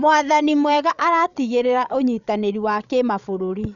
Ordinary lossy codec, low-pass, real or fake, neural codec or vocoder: none; 7.2 kHz; fake; codec, 16 kHz, 16 kbps, FreqCodec, larger model